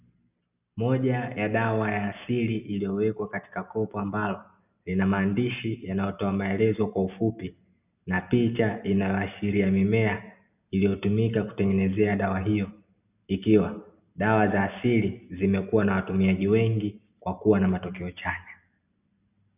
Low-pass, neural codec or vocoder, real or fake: 3.6 kHz; none; real